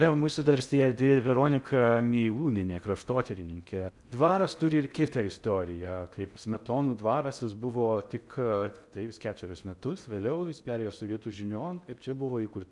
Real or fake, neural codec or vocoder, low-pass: fake; codec, 16 kHz in and 24 kHz out, 0.6 kbps, FocalCodec, streaming, 4096 codes; 10.8 kHz